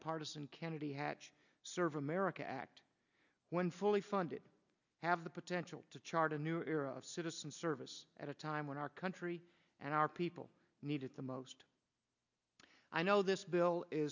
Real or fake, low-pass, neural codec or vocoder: real; 7.2 kHz; none